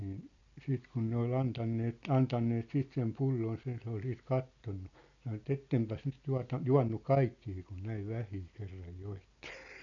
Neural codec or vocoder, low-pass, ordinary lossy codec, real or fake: none; 7.2 kHz; none; real